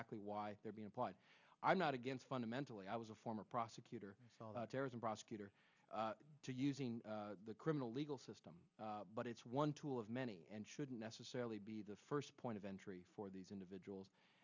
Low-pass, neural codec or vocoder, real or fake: 7.2 kHz; none; real